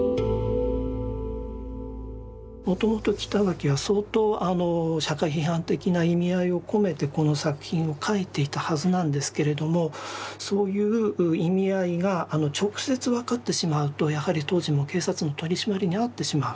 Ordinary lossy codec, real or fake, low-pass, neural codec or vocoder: none; real; none; none